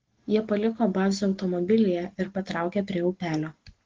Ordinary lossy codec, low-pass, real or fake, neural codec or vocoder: Opus, 16 kbps; 7.2 kHz; real; none